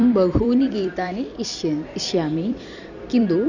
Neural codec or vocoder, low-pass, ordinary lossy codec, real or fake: vocoder, 44.1 kHz, 128 mel bands every 256 samples, BigVGAN v2; 7.2 kHz; none; fake